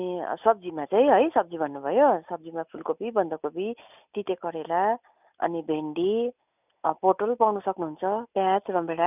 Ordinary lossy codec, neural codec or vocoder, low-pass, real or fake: none; none; 3.6 kHz; real